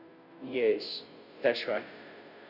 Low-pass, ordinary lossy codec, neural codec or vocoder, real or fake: 5.4 kHz; Opus, 64 kbps; codec, 16 kHz, 0.5 kbps, FunCodec, trained on Chinese and English, 25 frames a second; fake